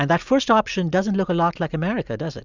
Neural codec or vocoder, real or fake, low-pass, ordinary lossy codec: none; real; 7.2 kHz; Opus, 64 kbps